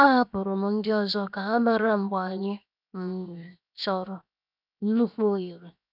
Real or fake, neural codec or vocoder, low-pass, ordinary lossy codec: fake; codec, 16 kHz, 0.7 kbps, FocalCodec; 5.4 kHz; none